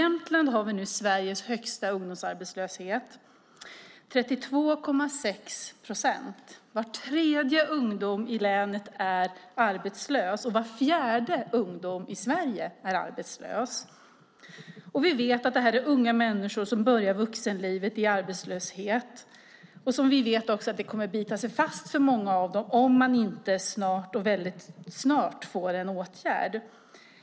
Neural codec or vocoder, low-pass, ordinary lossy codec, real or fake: none; none; none; real